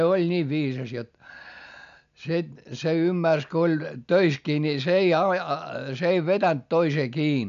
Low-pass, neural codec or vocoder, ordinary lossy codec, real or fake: 7.2 kHz; none; none; real